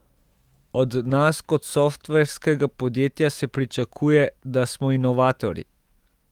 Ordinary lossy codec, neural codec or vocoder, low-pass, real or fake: Opus, 24 kbps; autoencoder, 48 kHz, 128 numbers a frame, DAC-VAE, trained on Japanese speech; 19.8 kHz; fake